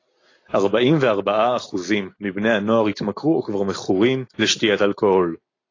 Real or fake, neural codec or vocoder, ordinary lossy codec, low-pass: real; none; AAC, 32 kbps; 7.2 kHz